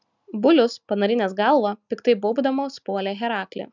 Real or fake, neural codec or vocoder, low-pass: real; none; 7.2 kHz